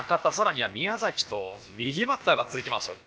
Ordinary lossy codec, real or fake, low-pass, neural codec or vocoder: none; fake; none; codec, 16 kHz, about 1 kbps, DyCAST, with the encoder's durations